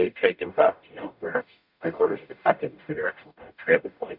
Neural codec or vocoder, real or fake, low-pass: codec, 44.1 kHz, 0.9 kbps, DAC; fake; 5.4 kHz